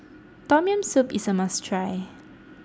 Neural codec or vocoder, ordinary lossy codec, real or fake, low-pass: none; none; real; none